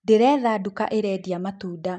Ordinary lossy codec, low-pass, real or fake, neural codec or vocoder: none; 10.8 kHz; real; none